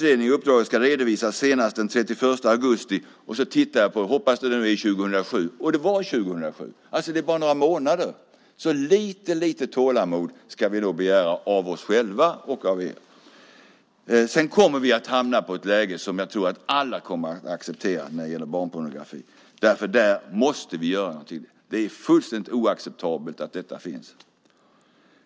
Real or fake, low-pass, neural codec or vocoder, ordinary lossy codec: real; none; none; none